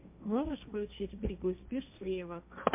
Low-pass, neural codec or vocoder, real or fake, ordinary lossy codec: 3.6 kHz; codec, 16 kHz, 1.1 kbps, Voila-Tokenizer; fake; MP3, 32 kbps